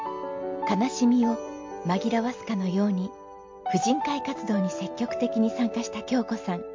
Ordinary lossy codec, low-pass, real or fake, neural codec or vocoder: MP3, 48 kbps; 7.2 kHz; real; none